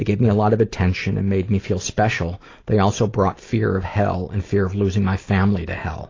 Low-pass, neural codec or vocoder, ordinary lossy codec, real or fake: 7.2 kHz; none; AAC, 32 kbps; real